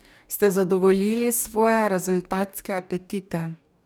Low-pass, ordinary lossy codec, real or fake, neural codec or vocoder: none; none; fake; codec, 44.1 kHz, 2.6 kbps, DAC